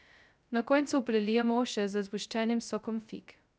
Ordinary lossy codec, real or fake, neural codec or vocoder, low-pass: none; fake; codec, 16 kHz, 0.2 kbps, FocalCodec; none